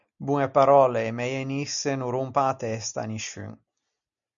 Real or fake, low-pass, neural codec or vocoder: real; 7.2 kHz; none